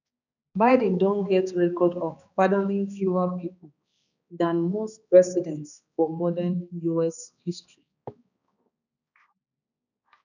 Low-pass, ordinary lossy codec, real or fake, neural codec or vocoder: 7.2 kHz; none; fake; codec, 16 kHz, 2 kbps, X-Codec, HuBERT features, trained on balanced general audio